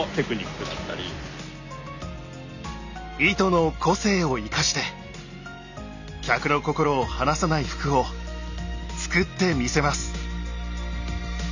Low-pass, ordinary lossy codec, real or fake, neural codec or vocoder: 7.2 kHz; none; real; none